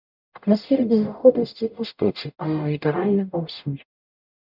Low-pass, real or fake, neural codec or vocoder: 5.4 kHz; fake; codec, 44.1 kHz, 0.9 kbps, DAC